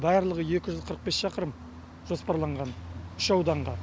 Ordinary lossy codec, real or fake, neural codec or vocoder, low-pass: none; real; none; none